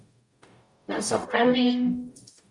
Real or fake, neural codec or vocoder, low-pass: fake; codec, 44.1 kHz, 0.9 kbps, DAC; 10.8 kHz